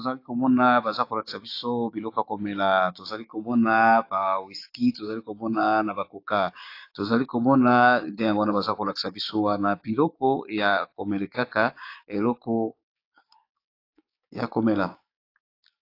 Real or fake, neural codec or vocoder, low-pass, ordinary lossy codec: fake; codec, 16 kHz, 6 kbps, DAC; 5.4 kHz; AAC, 32 kbps